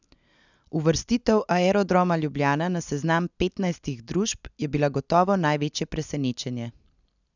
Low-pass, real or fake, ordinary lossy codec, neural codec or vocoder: 7.2 kHz; real; none; none